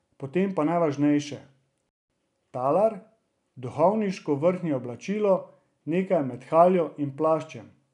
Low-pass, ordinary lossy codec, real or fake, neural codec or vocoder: 10.8 kHz; none; real; none